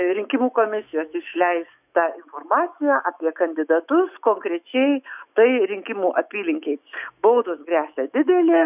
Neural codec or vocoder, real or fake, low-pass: autoencoder, 48 kHz, 128 numbers a frame, DAC-VAE, trained on Japanese speech; fake; 3.6 kHz